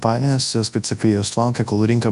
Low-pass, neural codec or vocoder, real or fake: 10.8 kHz; codec, 24 kHz, 0.9 kbps, WavTokenizer, large speech release; fake